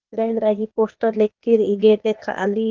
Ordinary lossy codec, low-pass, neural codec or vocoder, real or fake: Opus, 32 kbps; 7.2 kHz; codec, 16 kHz, 0.8 kbps, ZipCodec; fake